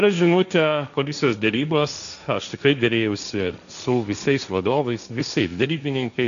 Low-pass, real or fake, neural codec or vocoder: 7.2 kHz; fake; codec, 16 kHz, 1.1 kbps, Voila-Tokenizer